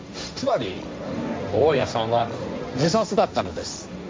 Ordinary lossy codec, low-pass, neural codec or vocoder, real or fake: none; none; codec, 16 kHz, 1.1 kbps, Voila-Tokenizer; fake